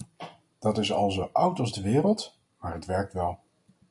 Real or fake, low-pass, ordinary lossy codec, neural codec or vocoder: real; 10.8 kHz; MP3, 96 kbps; none